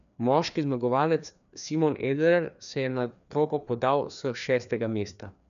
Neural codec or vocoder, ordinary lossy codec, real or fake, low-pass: codec, 16 kHz, 2 kbps, FreqCodec, larger model; none; fake; 7.2 kHz